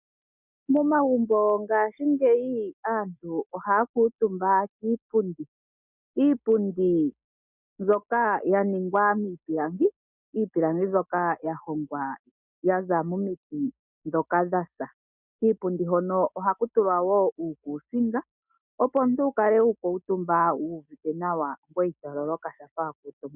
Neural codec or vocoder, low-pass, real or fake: none; 3.6 kHz; real